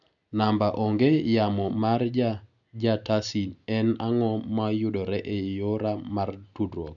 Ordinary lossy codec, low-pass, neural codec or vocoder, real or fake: none; 7.2 kHz; none; real